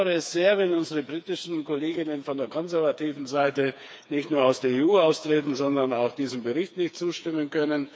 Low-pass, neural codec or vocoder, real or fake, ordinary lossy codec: none; codec, 16 kHz, 4 kbps, FreqCodec, smaller model; fake; none